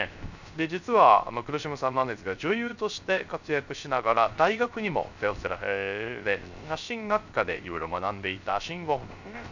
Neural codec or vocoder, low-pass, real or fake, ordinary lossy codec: codec, 16 kHz, 0.3 kbps, FocalCodec; 7.2 kHz; fake; none